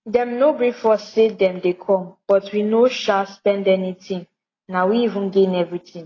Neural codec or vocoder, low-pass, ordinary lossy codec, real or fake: none; 7.2 kHz; AAC, 32 kbps; real